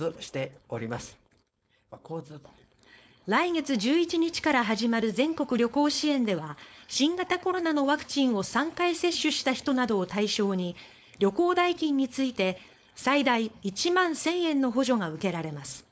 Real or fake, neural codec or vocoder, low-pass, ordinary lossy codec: fake; codec, 16 kHz, 4.8 kbps, FACodec; none; none